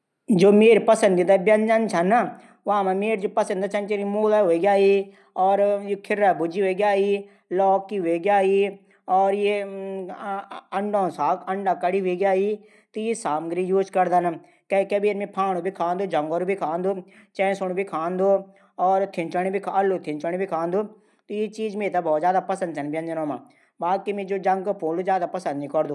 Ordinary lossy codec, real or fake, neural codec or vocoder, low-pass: none; real; none; none